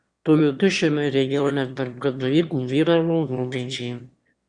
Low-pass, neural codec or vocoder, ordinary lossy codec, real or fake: 9.9 kHz; autoencoder, 22.05 kHz, a latent of 192 numbers a frame, VITS, trained on one speaker; Opus, 64 kbps; fake